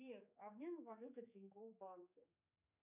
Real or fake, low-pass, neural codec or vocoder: fake; 3.6 kHz; codec, 16 kHz, 4 kbps, X-Codec, HuBERT features, trained on general audio